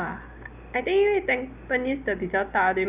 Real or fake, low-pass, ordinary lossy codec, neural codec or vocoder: real; 3.6 kHz; none; none